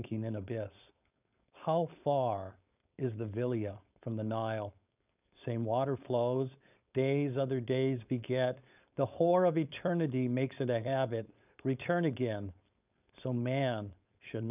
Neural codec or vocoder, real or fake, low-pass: codec, 16 kHz, 4.8 kbps, FACodec; fake; 3.6 kHz